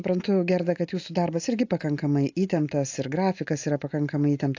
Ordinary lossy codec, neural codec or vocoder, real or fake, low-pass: AAC, 48 kbps; none; real; 7.2 kHz